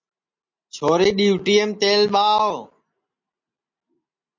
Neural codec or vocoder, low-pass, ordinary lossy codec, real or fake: none; 7.2 kHz; MP3, 48 kbps; real